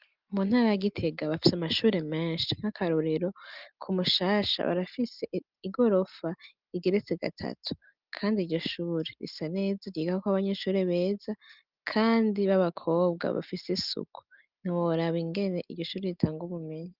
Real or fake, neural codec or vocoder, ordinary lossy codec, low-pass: real; none; Opus, 24 kbps; 5.4 kHz